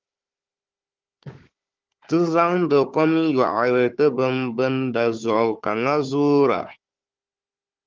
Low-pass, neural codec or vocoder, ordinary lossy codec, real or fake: 7.2 kHz; codec, 16 kHz, 4 kbps, FunCodec, trained on Chinese and English, 50 frames a second; Opus, 24 kbps; fake